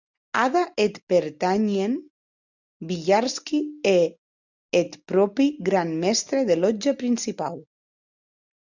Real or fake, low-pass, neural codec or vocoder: real; 7.2 kHz; none